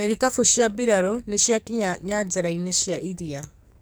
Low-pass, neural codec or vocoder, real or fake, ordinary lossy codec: none; codec, 44.1 kHz, 2.6 kbps, SNAC; fake; none